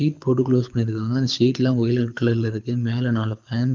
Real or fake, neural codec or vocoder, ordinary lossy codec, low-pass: fake; codec, 24 kHz, 6 kbps, HILCodec; Opus, 32 kbps; 7.2 kHz